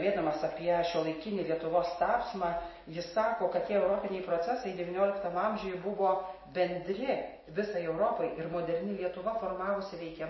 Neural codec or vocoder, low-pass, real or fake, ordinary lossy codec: none; 7.2 kHz; real; MP3, 24 kbps